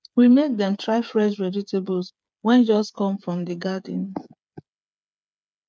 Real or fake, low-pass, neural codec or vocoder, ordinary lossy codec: fake; none; codec, 16 kHz, 8 kbps, FreqCodec, smaller model; none